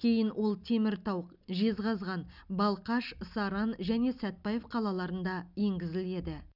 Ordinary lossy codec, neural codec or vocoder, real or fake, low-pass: none; none; real; 5.4 kHz